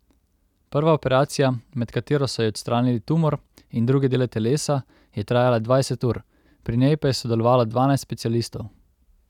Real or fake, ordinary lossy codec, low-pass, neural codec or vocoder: real; none; 19.8 kHz; none